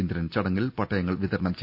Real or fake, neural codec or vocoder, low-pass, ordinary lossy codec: real; none; 5.4 kHz; none